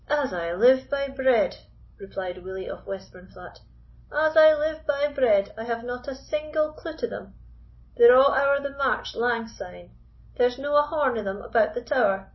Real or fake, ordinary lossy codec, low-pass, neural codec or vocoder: real; MP3, 24 kbps; 7.2 kHz; none